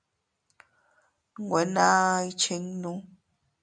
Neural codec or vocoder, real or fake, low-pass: none; real; 9.9 kHz